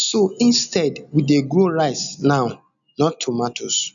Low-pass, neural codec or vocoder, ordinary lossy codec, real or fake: 7.2 kHz; none; none; real